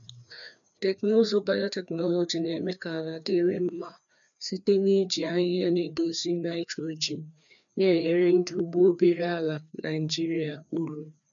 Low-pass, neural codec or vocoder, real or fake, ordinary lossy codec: 7.2 kHz; codec, 16 kHz, 2 kbps, FreqCodec, larger model; fake; none